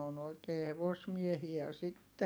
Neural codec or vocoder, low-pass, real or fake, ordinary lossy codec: codec, 44.1 kHz, 7.8 kbps, DAC; none; fake; none